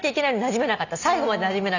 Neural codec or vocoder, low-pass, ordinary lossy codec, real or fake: none; 7.2 kHz; none; real